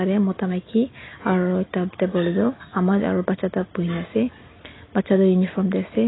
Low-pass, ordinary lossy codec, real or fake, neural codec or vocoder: 7.2 kHz; AAC, 16 kbps; real; none